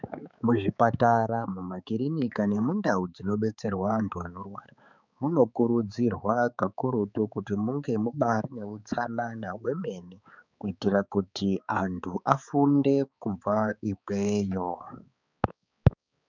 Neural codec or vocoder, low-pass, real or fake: codec, 16 kHz, 4 kbps, X-Codec, HuBERT features, trained on balanced general audio; 7.2 kHz; fake